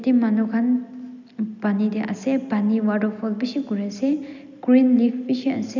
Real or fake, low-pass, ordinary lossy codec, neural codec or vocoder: real; 7.2 kHz; none; none